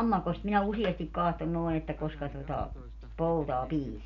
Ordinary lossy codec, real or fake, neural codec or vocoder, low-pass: none; real; none; 7.2 kHz